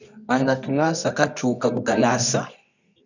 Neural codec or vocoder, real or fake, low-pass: codec, 24 kHz, 0.9 kbps, WavTokenizer, medium music audio release; fake; 7.2 kHz